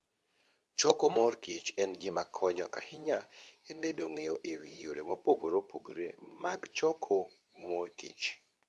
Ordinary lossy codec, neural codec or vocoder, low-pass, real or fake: none; codec, 24 kHz, 0.9 kbps, WavTokenizer, medium speech release version 2; none; fake